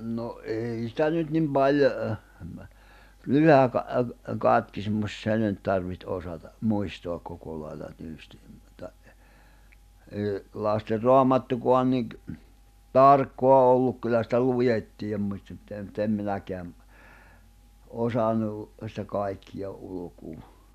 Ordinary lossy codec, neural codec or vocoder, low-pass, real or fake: none; none; 14.4 kHz; real